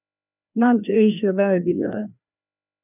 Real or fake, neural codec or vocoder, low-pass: fake; codec, 16 kHz, 1 kbps, FreqCodec, larger model; 3.6 kHz